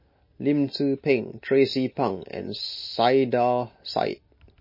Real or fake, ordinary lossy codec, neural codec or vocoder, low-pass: real; MP3, 24 kbps; none; 5.4 kHz